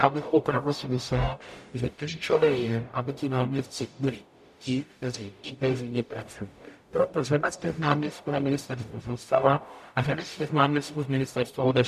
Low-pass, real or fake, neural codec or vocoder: 14.4 kHz; fake; codec, 44.1 kHz, 0.9 kbps, DAC